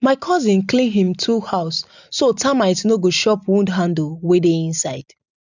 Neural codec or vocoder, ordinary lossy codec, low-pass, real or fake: none; none; 7.2 kHz; real